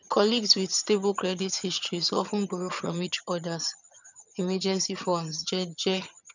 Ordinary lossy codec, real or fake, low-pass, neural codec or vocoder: none; fake; 7.2 kHz; vocoder, 22.05 kHz, 80 mel bands, HiFi-GAN